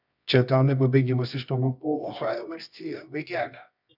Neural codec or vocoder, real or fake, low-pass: codec, 24 kHz, 0.9 kbps, WavTokenizer, medium music audio release; fake; 5.4 kHz